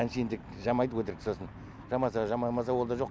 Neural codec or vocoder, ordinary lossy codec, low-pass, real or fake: none; none; none; real